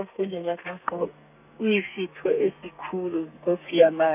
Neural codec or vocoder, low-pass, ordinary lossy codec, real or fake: codec, 32 kHz, 1.9 kbps, SNAC; 3.6 kHz; none; fake